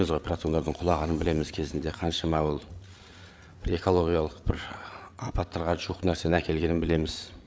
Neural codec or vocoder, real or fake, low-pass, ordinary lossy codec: codec, 16 kHz, 8 kbps, FreqCodec, larger model; fake; none; none